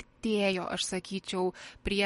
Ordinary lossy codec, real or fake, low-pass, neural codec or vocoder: MP3, 48 kbps; real; 19.8 kHz; none